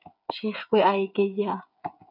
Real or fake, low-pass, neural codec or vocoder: fake; 5.4 kHz; codec, 16 kHz, 8 kbps, FreqCodec, smaller model